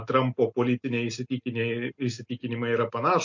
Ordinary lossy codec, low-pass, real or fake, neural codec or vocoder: MP3, 48 kbps; 7.2 kHz; real; none